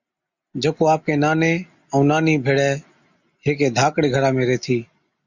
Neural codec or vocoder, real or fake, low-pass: none; real; 7.2 kHz